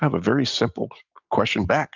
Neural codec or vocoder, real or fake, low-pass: none; real; 7.2 kHz